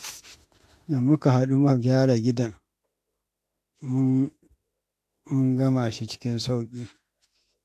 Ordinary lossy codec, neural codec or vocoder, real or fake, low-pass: AAC, 64 kbps; autoencoder, 48 kHz, 32 numbers a frame, DAC-VAE, trained on Japanese speech; fake; 14.4 kHz